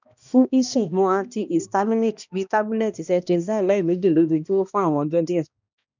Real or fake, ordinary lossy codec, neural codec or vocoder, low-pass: fake; none; codec, 16 kHz, 1 kbps, X-Codec, HuBERT features, trained on balanced general audio; 7.2 kHz